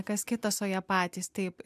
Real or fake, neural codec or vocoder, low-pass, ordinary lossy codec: real; none; 14.4 kHz; MP3, 96 kbps